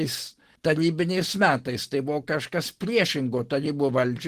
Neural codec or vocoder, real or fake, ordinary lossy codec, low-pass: none; real; Opus, 16 kbps; 14.4 kHz